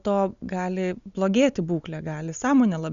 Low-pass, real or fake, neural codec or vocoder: 7.2 kHz; real; none